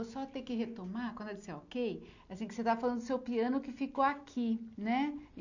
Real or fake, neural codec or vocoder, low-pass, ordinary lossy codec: real; none; 7.2 kHz; none